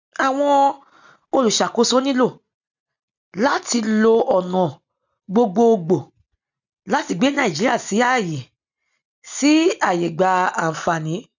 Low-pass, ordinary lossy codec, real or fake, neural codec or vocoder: 7.2 kHz; none; real; none